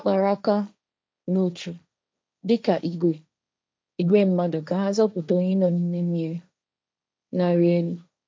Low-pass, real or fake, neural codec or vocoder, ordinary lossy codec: none; fake; codec, 16 kHz, 1.1 kbps, Voila-Tokenizer; none